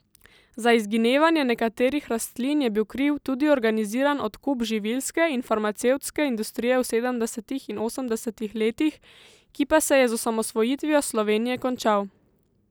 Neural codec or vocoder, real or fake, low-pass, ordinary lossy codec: none; real; none; none